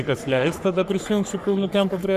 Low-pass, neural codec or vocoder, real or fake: 14.4 kHz; codec, 44.1 kHz, 3.4 kbps, Pupu-Codec; fake